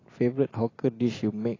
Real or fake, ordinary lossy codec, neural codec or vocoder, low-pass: fake; AAC, 32 kbps; vocoder, 44.1 kHz, 128 mel bands every 256 samples, BigVGAN v2; 7.2 kHz